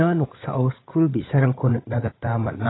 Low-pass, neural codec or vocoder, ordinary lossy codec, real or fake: 7.2 kHz; codec, 16 kHz, 16 kbps, FreqCodec, larger model; AAC, 16 kbps; fake